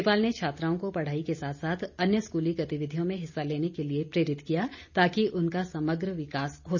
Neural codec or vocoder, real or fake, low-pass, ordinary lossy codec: none; real; 7.2 kHz; none